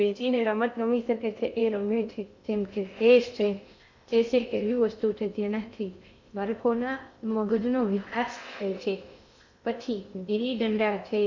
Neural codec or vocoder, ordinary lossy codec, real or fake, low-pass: codec, 16 kHz in and 24 kHz out, 0.6 kbps, FocalCodec, streaming, 2048 codes; MP3, 64 kbps; fake; 7.2 kHz